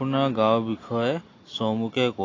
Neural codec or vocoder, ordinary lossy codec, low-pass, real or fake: none; AAC, 32 kbps; 7.2 kHz; real